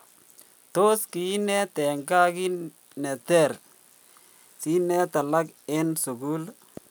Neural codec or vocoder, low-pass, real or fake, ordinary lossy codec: none; none; real; none